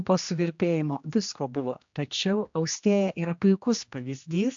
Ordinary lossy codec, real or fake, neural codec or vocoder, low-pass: AAC, 64 kbps; fake; codec, 16 kHz, 1 kbps, X-Codec, HuBERT features, trained on general audio; 7.2 kHz